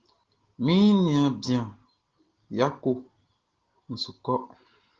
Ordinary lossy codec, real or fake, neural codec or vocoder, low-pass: Opus, 16 kbps; real; none; 7.2 kHz